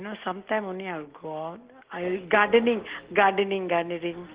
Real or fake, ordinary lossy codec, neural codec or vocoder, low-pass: real; Opus, 16 kbps; none; 3.6 kHz